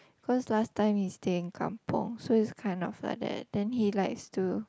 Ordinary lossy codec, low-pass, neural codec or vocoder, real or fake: none; none; none; real